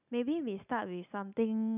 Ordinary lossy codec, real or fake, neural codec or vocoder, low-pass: none; real; none; 3.6 kHz